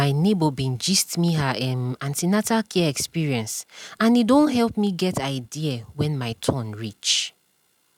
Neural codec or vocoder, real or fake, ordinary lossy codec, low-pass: none; real; none; 19.8 kHz